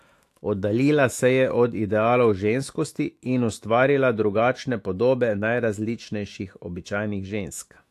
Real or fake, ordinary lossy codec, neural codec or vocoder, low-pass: fake; AAC, 64 kbps; autoencoder, 48 kHz, 128 numbers a frame, DAC-VAE, trained on Japanese speech; 14.4 kHz